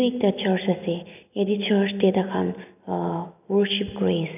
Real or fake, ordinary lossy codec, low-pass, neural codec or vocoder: real; none; 3.6 kHz; none